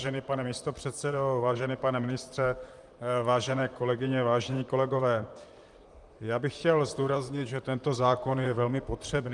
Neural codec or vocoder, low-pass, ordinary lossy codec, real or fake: vocoder, 44.1 kHz, 128 mel bands, Pupu-Vocoder; 10.8 kHz; Opus, 32 kbps; fake